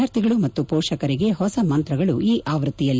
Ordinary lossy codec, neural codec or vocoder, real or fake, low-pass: none; none; real; none